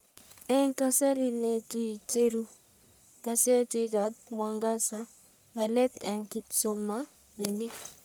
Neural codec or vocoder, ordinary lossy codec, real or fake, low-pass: codec, 44.1 kHz, 1.7 kbps, Pupu-Codec; none; fake; none